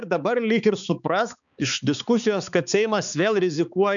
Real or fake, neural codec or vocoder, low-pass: fake; codec, 16 kHz, 4 kbps, X-Codec, HuBERT features, trained on balanced general audio; 7.2 kHz